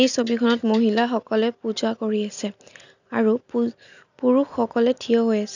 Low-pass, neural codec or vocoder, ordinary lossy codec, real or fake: 7.2 kHz; none; none; real